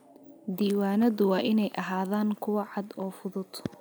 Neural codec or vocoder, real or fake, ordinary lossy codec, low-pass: none; real; none; none